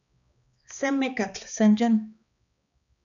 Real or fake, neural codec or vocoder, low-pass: fake; codec, 16 kHz, 2 kbps, X-Codec, HuBERT features, trained on balanced general audio; 7.2 kHz